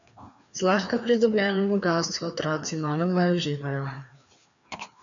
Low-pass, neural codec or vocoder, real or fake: 7.2 kHz; codec, 16 kHz, 2 kbps, FreqCodec, larger model; fake